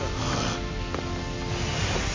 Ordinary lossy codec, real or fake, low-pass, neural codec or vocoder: MP3, 32 kbps; real; 7.2 kHz; none